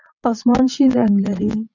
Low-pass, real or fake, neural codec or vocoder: 7.2 kHz; fake; vocoder, 22.05 kHz, 80 mel bands, Vocos